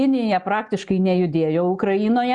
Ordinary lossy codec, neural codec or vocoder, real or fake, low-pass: Opus, 64 kbps; none; real; 10.8 kHz